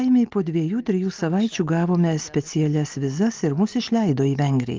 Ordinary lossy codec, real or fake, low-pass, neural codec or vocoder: Opus, 32 kbps; real; 7.2 kHz; none